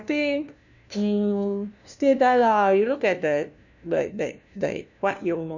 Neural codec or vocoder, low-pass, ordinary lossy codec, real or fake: codec, 16 kHz, 1 kbps, FunCodec, trained on LibriTTS, 50 frames a second; 7.2 kHz; none; fake